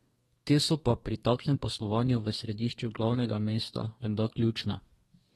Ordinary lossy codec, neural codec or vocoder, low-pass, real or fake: AAC, 32 kbps; codec, 32 kHz, 1.9 kbps, SNAC; 14.4 kHz; fake